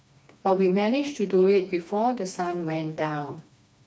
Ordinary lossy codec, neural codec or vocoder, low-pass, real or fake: none; codec, 16 kHz, 2 kbps, FreqCodec, smaller model; none; fake